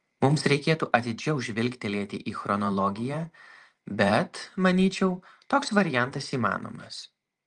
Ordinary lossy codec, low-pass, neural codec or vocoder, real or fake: Opus, 32 kbps; 10.8 kHz; vocoder, 44.1 kHz, 128 mel bands every 512 samples, BigVGAN v2; fake